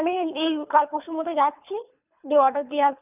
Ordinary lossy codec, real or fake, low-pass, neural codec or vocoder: none; fake; 3.6 kHz; codec, 24 kHz, 3 kbps, HILCodec